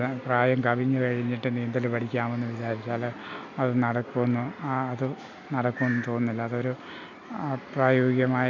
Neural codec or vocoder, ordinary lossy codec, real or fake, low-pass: none; none; real; 7.2 kHz